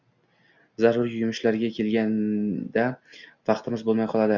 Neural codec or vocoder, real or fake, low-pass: none; real; 7.2 kHz